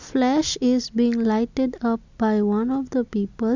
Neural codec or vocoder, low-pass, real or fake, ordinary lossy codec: none; 7.2 kHz; real; none